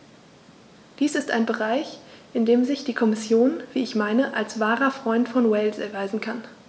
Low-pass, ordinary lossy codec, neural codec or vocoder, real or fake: none; none; none; real